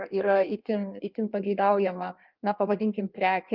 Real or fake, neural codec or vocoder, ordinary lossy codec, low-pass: fake; codec, 16 kHz in and 24 kHz out, 1.1 kbps, FireRedTTS-2 codec; Opus, 32 kbps; 5.4 kHz